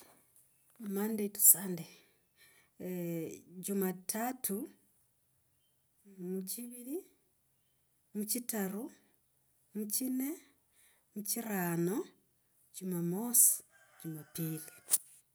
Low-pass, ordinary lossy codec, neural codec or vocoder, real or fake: none; none; none; real